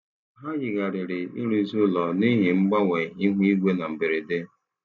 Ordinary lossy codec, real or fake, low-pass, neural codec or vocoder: none; real; 7.2 kHz; none